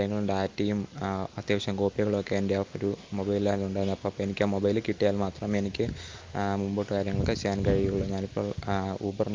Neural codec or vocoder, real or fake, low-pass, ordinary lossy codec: none; real; 7.2 kHz; Opus, 32 kbps